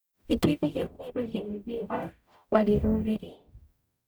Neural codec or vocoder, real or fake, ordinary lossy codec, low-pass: codec, 44.1 kHz, 0.9 kbps, DAC; fake; none; none